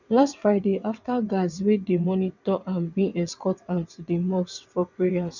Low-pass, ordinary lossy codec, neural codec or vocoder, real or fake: 7.2 kHz; none; vocoder, 22.05 kHz, 80 mel bands, WaveNeXt; fake